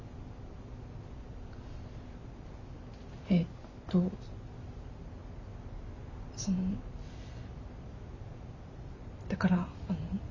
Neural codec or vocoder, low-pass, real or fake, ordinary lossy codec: none; 7.2 kHz; real; none